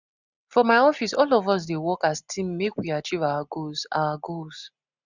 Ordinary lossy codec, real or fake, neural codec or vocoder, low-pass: none; real; none; 7.2 kHz